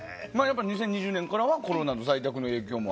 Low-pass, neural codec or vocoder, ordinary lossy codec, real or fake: none; none; none; real